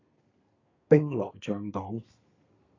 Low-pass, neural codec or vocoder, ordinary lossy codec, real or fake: 7.2 kHz; codec, 32 kHz, 1.9 kbps, SNAC; AAC, 32 kbps; fake